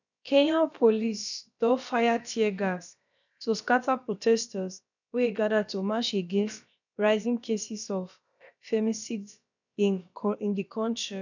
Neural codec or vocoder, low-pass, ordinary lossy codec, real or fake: codec, 16 kHz, about 1 kbps, DyCAST, with the encoder's durations; 7.2 kHz; none; fake